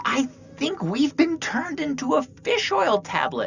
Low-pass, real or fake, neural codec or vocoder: 7.2 kHz; real; none